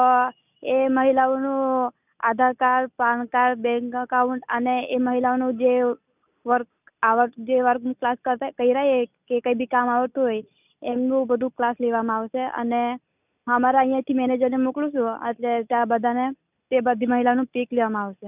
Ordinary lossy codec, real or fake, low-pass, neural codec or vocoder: none; real; 3.6 kHz; none